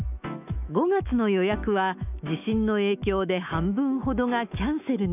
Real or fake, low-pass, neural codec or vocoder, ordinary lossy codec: fake; 3.6 kHz; autoencoder, 48 kHz, 128 numbers a frame, DAC-VAE, trained on Japanese speech; none